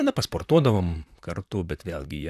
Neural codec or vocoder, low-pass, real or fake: vocoder, 44.1 kHz, 128 mel bands, Pupu-Vocoder; 14.4 kHz; fake